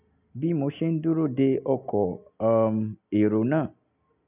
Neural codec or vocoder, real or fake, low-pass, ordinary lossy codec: none; real; 3.6 kHz; none